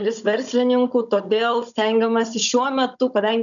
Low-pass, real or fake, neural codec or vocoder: 7.2 kHz; fake; codec, 16 kHz, 16 kbps, FunCodec, trained on Chinese and English, 50 frames a second